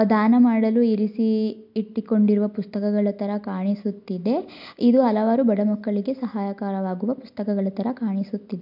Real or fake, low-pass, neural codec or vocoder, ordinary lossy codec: real; 5.4 kHz; none; MP3, 48 kbps